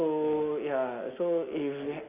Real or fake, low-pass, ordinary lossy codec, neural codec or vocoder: real; 3.6 kHz; MP3, 32 kbps; none